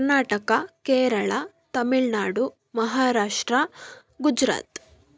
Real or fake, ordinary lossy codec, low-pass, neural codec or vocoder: real; none; none; none